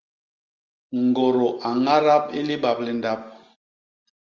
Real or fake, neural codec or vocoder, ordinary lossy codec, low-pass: real; none; Opus, 32 kbps; 7.2 kHz